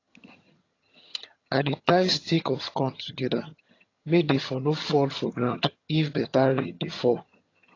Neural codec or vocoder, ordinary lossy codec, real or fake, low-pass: vocoder, 22.05 kHz, 80 mel bands, HiFi-GAN; AAC, 32 kbps; fake; 7.2 kHz